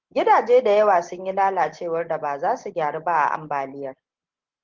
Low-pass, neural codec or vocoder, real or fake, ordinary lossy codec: 7.2 kHz; none; real; Opus, 16 kbps